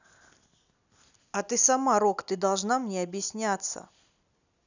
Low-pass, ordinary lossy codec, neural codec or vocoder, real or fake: 7.2 kHz; none; none; real